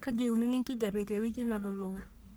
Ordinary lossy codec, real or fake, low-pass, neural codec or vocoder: none; fake; none; codec, 44.1 kHz, 1.7 kbps, Pupu-Codec